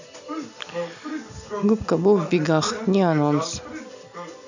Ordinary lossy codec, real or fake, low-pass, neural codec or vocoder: none; real; 7.2 kHz; none